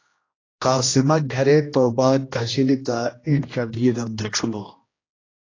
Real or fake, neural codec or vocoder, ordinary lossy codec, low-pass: fake; codec, 16 kHz, 1 kbps, X-Codec, HuBERT features, trained on general audio; AAC, 32 kbps; 7.2 kHz